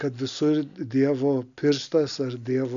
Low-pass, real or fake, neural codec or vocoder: 7.2 kHz; real; none